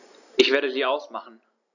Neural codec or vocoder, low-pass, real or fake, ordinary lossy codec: none; 7.2 kHz; real; none